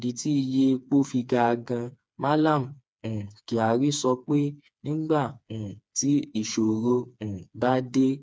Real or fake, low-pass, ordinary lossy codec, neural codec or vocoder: fake; none; none; codec, 16 kHz, 4 kbps, FreqCodec, smaller model